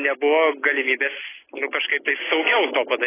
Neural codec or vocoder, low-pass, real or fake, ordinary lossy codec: none; 3.6 kHz; real; AAC, 16 kbps